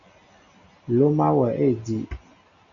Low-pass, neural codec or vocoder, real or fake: 7.2 kHz; none; real